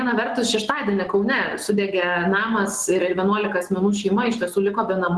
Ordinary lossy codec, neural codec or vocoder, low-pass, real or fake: Opus, 16 kbps; none; 10.8 kHz; real